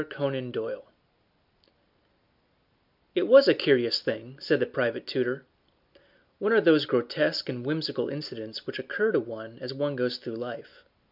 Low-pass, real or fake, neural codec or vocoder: 5.4 kHz; real; none